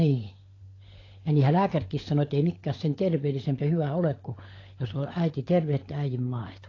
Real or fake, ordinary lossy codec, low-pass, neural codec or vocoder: fake; AAC, 32 kbps; 7.2 kHz; codec, 16 kHz, 16 kbps, FunCodec, trained on LibriTTS, 50 frames a second